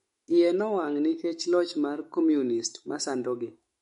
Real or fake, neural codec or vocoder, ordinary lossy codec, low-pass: fake; autoencoder, 48 kHz, 128 numbers a frame, DAC-VAE, trained on Japanese speech; MP3, 48 kbps; 19.8 kHz